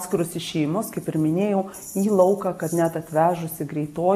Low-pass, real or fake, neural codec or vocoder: 14.4 kHz; real; none